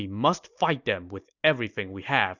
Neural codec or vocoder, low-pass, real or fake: none; 7.2 kHz; real